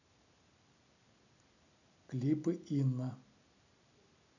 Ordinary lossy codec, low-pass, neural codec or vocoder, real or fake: none; 7.2 kHz; none; real